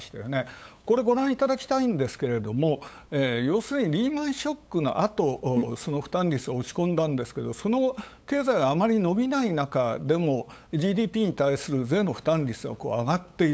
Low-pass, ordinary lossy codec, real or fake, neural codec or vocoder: none; none; fake; codec, 16 kHz, 8 kbps, FunCodec, trained on LibriTTS, 25 frames a second